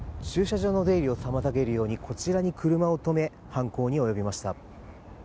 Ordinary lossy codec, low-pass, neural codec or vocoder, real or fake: none; none; none; real